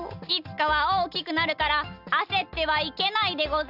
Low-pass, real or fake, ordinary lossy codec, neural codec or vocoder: 5.4 kHz; real; Opus, 64 kbps; none